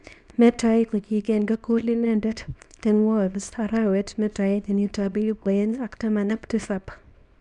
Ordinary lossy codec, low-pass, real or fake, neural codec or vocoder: none; 10.8 kHz; fake; codec, 24 kHz, 0.9 kbps, WavTokenizer, small release